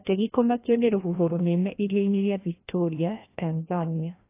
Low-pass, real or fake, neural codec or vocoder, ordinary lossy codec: 3.6 kHz; fake; codec, 16 kHz, 1 kbps, FreqCodec, larger model; AAC, 24 kbps